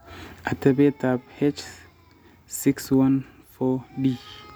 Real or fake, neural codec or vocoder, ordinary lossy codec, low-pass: real; none; none; none